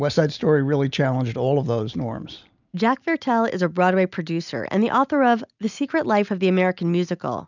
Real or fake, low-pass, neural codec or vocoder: real; 7.2 kHz; none